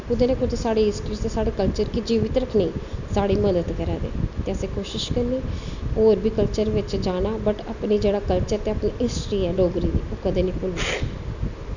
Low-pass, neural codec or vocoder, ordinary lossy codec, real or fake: 7.2 kHz; none; none; real